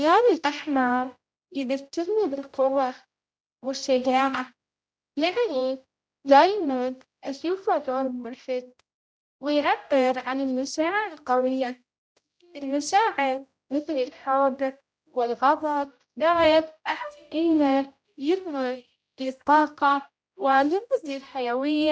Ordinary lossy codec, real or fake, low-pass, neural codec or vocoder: none; fake; none; codec, 16 kHz, 0.5 kbps, X-Codec, HuBERT features, trained on general audio